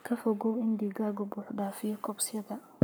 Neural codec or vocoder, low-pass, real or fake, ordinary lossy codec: codec, 44.1 kHz, 7.8 kbps, Pupu-Codec; none; fake; none